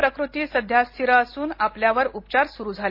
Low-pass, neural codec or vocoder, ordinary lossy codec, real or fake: 5.4 kHz; none; MP3, 32 kbps; real